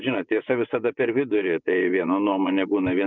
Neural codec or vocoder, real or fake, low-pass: vocoder, 24 kHz, 100 mel bands, Vocos; fake; 7.2 kHz